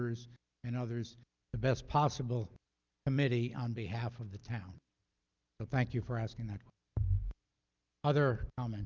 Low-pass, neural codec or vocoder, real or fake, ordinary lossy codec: 7.2 kHz; none; real; Opus, 24 kbps